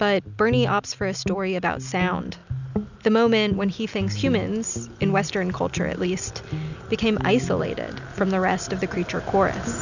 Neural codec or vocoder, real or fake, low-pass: none; real; 7.2 kHz